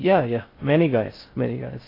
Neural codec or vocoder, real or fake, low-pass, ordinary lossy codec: codec, 16 kHz in and 24 kHz out, 0.6 kbps, FocalCodec, streaming, 4096 codes; fake; 5.4 kHz; AAC, 24 kbps